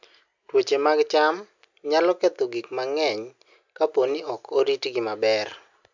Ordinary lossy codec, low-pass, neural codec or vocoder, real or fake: MP3, 64 kbps; 7.2 kHz; none; real